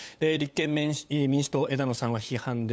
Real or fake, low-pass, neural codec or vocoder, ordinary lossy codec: fake; none; codec, 16 kHz, 8 kbps, FunCodec, trained on LibriTTS, 25 frames a second; none